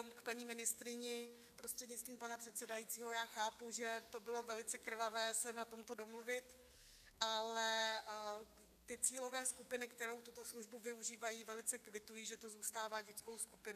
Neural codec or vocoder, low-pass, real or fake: codec, 32 kHz, 1.9 kbps, SNAC; 14.4 kHz; fake